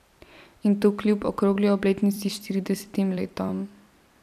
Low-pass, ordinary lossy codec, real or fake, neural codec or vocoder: 14.4 kHz; none; fake; vocoder, 44.1 kHz, 128 mel bands every 256 samples, BigVGAN v2